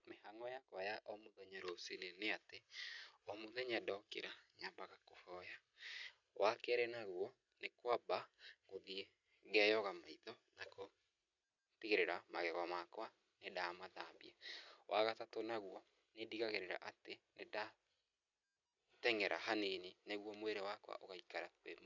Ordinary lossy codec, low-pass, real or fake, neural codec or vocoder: none; 7.2 kHz; real; none